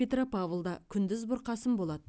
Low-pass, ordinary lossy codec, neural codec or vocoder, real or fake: none; none; none; real